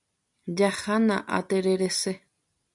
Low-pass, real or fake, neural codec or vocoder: 10.8 kHz; real; none